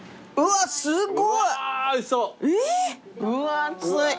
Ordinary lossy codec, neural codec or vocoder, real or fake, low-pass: none; none; real; none